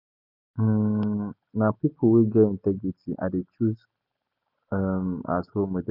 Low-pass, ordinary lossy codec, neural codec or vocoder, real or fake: 5.4 kHz; none; none; real